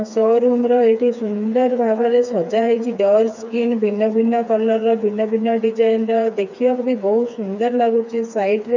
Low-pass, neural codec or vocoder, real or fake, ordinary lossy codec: 7.2 kHz; codec, 16 kHz, 4 kbps, FreqCodec, smaller model; fake; none